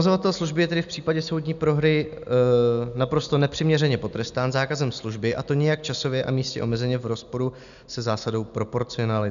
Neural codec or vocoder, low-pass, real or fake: none; 7.2 kHz; real